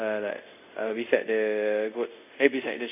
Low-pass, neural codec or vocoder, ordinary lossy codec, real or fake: 3.6 kHz; codec, 24 kHz, 0.5 kbps, DualCodec; AAC, 32 kbps; fake